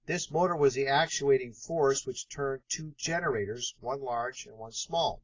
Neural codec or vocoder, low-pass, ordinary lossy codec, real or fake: none; 7.2 kHz; AAC, 32 kbps; real